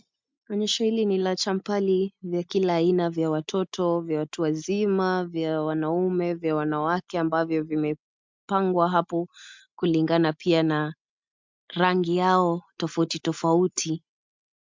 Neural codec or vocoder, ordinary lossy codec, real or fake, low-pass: none; MP3, 64 kbps; real; 7.2 kHz